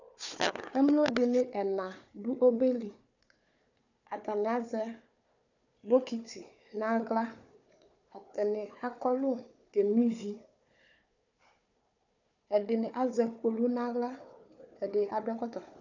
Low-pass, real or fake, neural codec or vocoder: 7.2 kHz; fake; codec, 16 kHz, 4 kbps, FunCodec, trained on Chinese and English, 50 frames a second